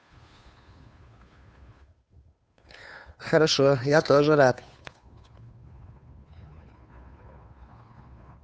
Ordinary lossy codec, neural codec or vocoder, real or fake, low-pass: none; codec, 16 kHz, 2 kbps, FunCodec, trained on Chinese and English, 25 frames a second; fake; none